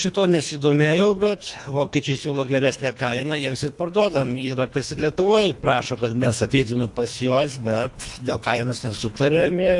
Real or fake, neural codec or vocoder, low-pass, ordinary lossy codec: fake; codec, 24 kHz, 1.5 kbps, HILCodec; 10.8 kHz; AAC, 64 kbps